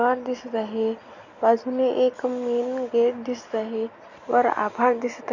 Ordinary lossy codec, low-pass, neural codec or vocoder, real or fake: none; 7.2 kHz; none; real